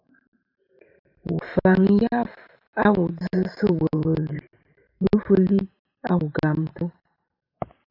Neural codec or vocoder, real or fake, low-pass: vocoder, 44.1 kHz, 128 mel bands every 512 samples, BigVGAN v2; fake; 5.4 kHz